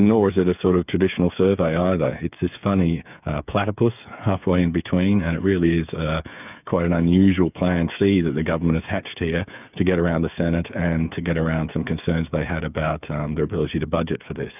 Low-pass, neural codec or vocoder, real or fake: 3.6 kHz; codec, 16 kHz, 8 kbps, FreqCodec, smaller model; fake